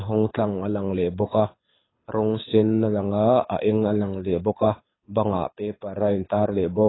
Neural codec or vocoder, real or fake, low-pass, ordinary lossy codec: codec, 24 kHz, 6 kbps, HILCodec; fake; 7.2 kHz; AAC, 16 kbps